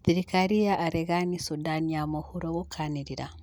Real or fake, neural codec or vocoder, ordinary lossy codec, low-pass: real; none; none; 19.8 kHz